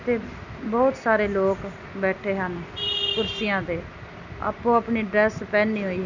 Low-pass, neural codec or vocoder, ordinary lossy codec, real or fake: 7.2 kHz; none; none; real